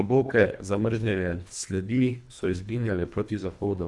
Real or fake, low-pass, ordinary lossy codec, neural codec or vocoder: fake; none; none; codec, 24 kHz, 1.5 kbps, HILCodec